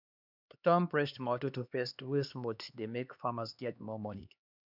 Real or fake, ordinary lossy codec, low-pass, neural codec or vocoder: fake; none; 5.4 kHz; codec, 16 kHz, 2 kbps, X-Codec, HuBERT features, trained on LibriSpeech